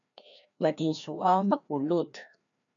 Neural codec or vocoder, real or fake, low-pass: codec, 16 kHz, 1 kbps, FreqCodec, larger model; fake; 7.2 kHz